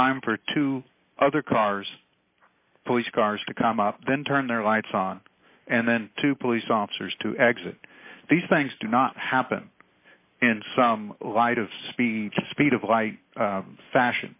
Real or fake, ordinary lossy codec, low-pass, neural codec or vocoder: real; AAC, 32 kbps; 3.6 kHz; none